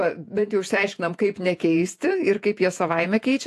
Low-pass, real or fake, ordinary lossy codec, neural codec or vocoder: 14.4 kHz; fake; AAC, 64 kbps; vocoder, 48 kHz, 128 mel bands, Vocos